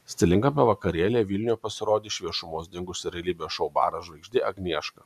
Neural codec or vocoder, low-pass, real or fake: none; 14.4 kHz; real